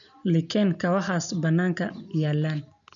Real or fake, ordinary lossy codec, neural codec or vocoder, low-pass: real; none; none; 7.2 kHz